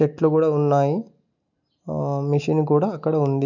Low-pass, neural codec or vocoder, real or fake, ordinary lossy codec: 7.2 kHz; none; real; none